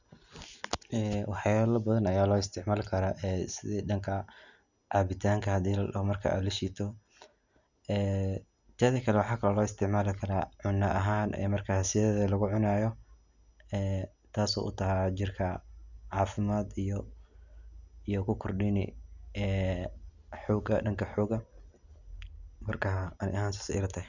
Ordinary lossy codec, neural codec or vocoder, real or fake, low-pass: none; none; real; 7.2 kHz